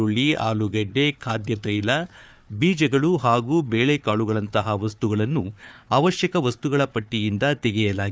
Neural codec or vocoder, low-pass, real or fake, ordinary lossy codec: codec, 16 kHz, 4 kbps, FunCodec, trained on Chinese and English, 50 frames a second; none; fake; none